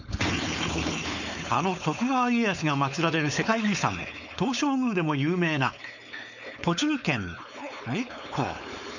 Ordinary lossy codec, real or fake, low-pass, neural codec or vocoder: none; fake; 7.2 kHz; codec, 16 kHz, 4.8 kbps, FACodec